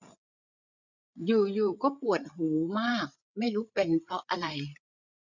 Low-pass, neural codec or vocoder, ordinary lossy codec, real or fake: 7.2 kHz; codec, 16 kHz, 8 kbps, FreqCodec, larger model; none; fake